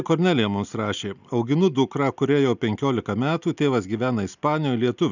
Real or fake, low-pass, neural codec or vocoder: real; 7.2 kHz; none